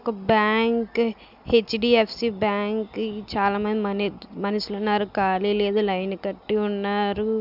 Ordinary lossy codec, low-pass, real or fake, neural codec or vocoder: none; 5.4 kHz; real; none